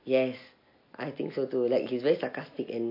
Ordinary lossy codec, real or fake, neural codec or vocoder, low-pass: MP3, 32 kbps; real; none; 5.4 kHz